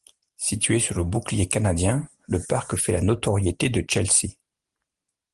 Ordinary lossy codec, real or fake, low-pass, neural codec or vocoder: Opus, 24 kbps; real; 10.8 kHz; none